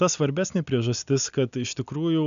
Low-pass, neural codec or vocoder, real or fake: 7.2 kHz; none; real